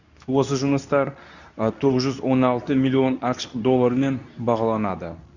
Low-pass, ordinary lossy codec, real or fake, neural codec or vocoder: 7.2 kHz; none; fake; codec, 24 kHz, 0.9 kbps, WavTokenizer, medium speech release version 2